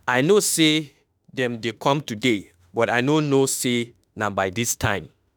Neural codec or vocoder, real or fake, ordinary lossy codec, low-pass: autoencoder, 48 kHz, 32 numbers a frame, DAC-VAE, trained on Japanese speech; fake; none; none